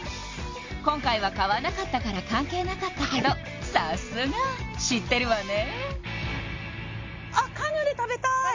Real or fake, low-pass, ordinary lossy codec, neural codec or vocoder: real; 7.2 kHz; MP3, 48 kbps; none